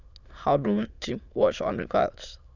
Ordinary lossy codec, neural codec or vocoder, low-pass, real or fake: none; autoencoder, 22.05 kHz, a latent of 192 numbers a frame, VITS, trained on many speakers; 7.2 kHz; fake